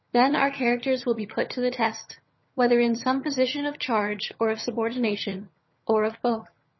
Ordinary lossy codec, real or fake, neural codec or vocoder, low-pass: MP3, 24 kbps; fake; vocoder, 22.05 kHz, 80 mel bands, HiFi-GAN; 7.2 kHz